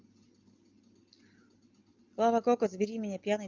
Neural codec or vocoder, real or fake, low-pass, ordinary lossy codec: none; real; 7.2 kHz; Opus, 24 kbps